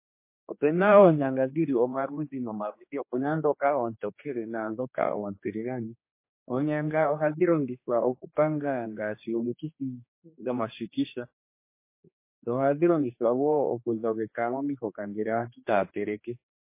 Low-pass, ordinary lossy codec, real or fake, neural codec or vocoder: 3.6 kHz; MP3, 24 kbps; fake; codec, 16 kHz, 2 kbps, X-Codec, HuBERT features, trained on general audio